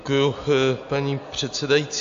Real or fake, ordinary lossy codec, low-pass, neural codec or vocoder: real; AAC, 64 kbps; 7.2 kHz; none